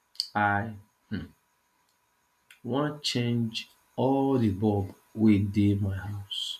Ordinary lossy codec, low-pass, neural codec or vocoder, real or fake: none; 14.4 kHz; none; real